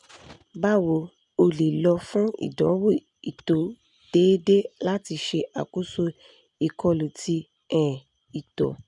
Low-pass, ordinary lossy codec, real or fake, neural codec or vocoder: 10.8 kHz; none; real; none